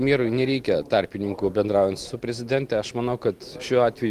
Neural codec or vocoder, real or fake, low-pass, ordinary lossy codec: none; real; 14.4 kHz; Opus, 24 kbps